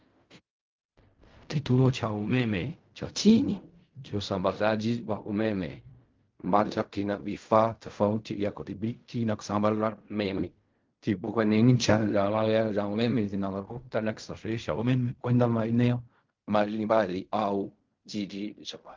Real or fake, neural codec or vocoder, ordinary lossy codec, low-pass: fake; codec, 16 kHz in and 24 kHz out, 0.4 kbps, LongCat-Audio-Codec, fine tuned four codebook decoder; Opus, 24 kbps; 7.2 kHz